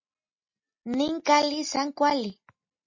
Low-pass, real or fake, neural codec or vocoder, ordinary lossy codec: 7.2 kHz; real; none; MP3, 32 kbps